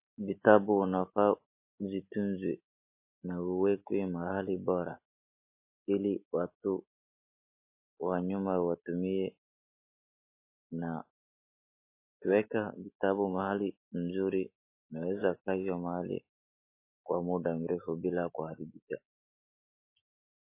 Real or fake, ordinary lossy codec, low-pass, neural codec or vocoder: real; MP3, 24 kbps; 3.6 kHz; none